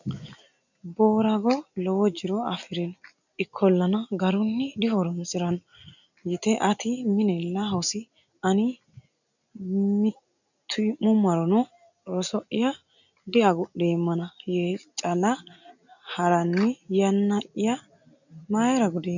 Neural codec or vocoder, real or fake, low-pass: none; real; 7.2 kHz